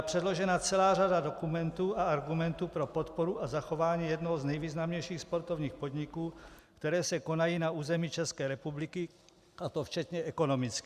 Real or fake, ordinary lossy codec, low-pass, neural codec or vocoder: real; Opus, 64 kbps; 14.4 kHz; none